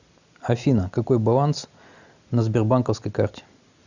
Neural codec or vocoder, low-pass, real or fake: none; 7.2 kHz; real